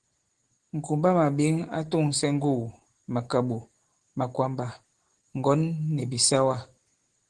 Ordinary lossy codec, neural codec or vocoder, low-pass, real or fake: Opus, 16 kbps; none; 9.9 kHz; real